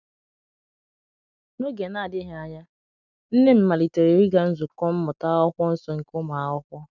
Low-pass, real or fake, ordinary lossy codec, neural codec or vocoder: 7.2 kHz; real; none; none